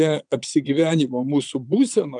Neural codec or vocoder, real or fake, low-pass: vocoder, 22.05 kHz, 80 mel bands, Vocos; fake; 9.9 kHz